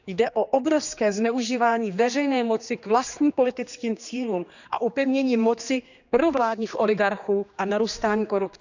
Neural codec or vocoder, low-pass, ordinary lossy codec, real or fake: codec, 16 kHz, 2 kbps, X-Codec, HuBERT features, trained on general audio; 7.2 kHz; none; fake